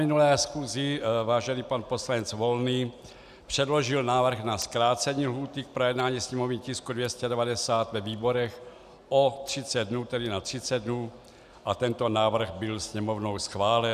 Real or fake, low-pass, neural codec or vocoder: real; 14.4 kHz; none